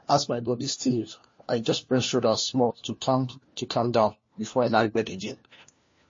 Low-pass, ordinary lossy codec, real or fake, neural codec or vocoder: 7.2 kHz; MP3, 32 kbps; fake; codec, 16 kHz, 1 kbps, FunCodec, trained on LibriTTS, 50 frames a second